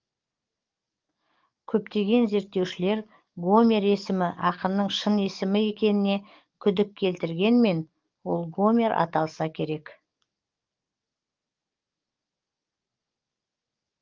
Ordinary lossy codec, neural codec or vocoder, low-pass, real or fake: Opus, 24 kbps; codec, 44.1 kHz, 7.8 kbps, DAC; 7.2 kHz; fake